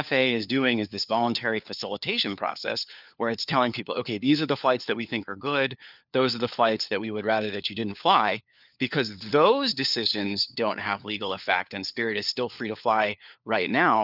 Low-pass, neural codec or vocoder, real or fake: 5.4 kHz; codec, 16 kHz, 4 kbps, FunCodec, trained on LibriTTS, 50 frames a second; fake